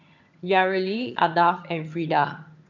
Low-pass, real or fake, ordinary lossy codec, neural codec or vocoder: 7.2 kHz; fake; none; vocoder, 22.05 kHz, 80 mel bands, HiFi-GAN